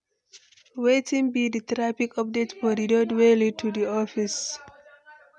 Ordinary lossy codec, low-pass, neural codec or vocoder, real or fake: none; 10.8 kHz; none; real